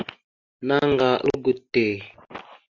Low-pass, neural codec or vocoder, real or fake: 7.2 kHz; none; real